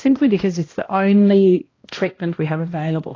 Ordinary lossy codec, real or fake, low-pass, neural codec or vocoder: AAC, 32 kbps; fake; 7.2 kHz; codec, 16 kHz, 1 kbps, X-Codec, HuBERT features, trained on balanced general audio